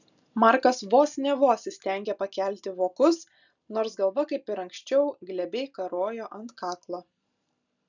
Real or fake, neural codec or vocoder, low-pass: real; none; 7.2 kHz